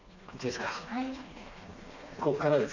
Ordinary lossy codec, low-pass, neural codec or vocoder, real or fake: none; 7.2 kHz; codec, 16 kHz, 2 kbps, FreqCodec, smaller model; fake